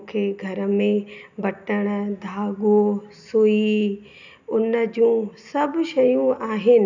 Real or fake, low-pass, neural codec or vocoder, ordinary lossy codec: real; 7.2 kHz; none; none